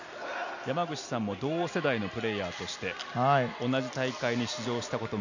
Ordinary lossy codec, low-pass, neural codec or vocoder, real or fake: none; 7.2 kHz; none; real